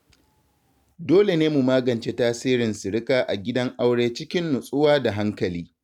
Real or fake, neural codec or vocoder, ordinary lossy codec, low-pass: real; none; none; 19.8 kHz